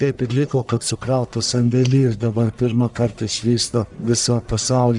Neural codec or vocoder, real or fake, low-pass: codec, 44.1 kHz, 1.7 kbps, Pupu-Codec; fake; 10.8 kHz